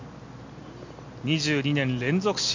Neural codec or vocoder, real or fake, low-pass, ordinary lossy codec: none; real; 7.2 kHz; MP3, 48 kbps